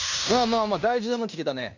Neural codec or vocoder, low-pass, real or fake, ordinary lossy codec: codec, 16 kHz in and 24 kHz out, 0.9 kbps, LongCat-Audio-Codec, fine tuned four codebook decoder; 7.2 kHz; fake; none